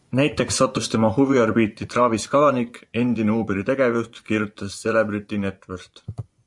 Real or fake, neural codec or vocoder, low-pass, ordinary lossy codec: fake; vocoder, 24 kHz, 100 mel bands, Vocos; 10.8 kHz; MP3, 64 kbps